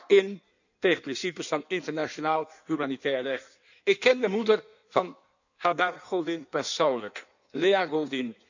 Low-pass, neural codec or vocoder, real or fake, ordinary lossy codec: 7.2 kHz; codec, 16 kHz in and 24 kHz out, 1.1 kbps, FireRedTTS-2 codec; fake; none